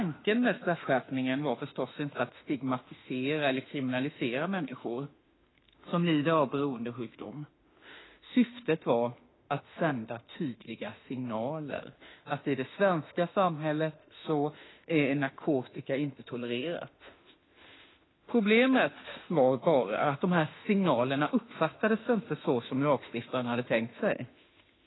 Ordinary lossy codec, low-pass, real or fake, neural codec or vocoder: AAC, 16 kbps; 7.2 kHz; fake; autoencoder, 48 kHz, 32 numbers a frame, DAC-VAE, trained on Japanese speech